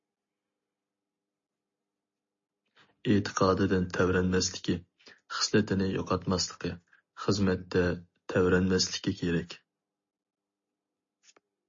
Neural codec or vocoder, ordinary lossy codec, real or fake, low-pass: none; MP3, 32 kbps; real; 7.2 kHz